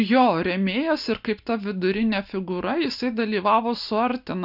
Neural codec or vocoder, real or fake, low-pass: none; real; 5.4 kHz